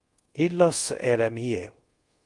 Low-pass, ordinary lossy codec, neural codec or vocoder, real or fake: 10.8 kHz; Opus, 32 kbps; codec, 24 kHz, 0.5 kbps, DualCodec; fake